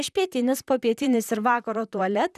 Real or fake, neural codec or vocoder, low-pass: fake; vocoder, 44.1 kHz, 128 mel bands, Pupu-Vocoder; 14.4 kHz